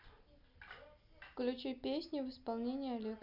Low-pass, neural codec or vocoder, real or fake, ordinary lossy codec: 5.4 kHz; none; real; none